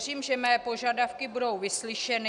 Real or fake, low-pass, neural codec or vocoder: real; 10.8 kHz; none